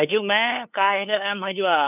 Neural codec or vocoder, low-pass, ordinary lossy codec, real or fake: codec, 16 kHz, 2 kbps, FunCodec, trained on LibriTTS, 25 frames a second; 3.6 kHz; none; fake